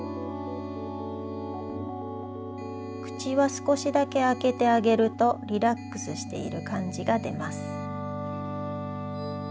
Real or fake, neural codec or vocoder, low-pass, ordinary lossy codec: real; none; none; none